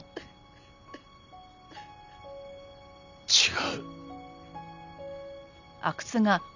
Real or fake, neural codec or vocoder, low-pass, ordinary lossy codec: real; none; 7.2 kHz; none